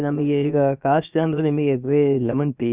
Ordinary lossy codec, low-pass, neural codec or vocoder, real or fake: Opus, 64 kbps; 3.6 kHz; codec, 16 kHz, about 1 kbps, DyCAST, with the encoder's durations; fake